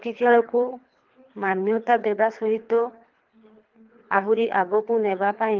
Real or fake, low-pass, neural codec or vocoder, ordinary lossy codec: fake; 7.2 kHz; codec, 24 kHz, 3 kbps, HILCodec; Opus, 32 kbps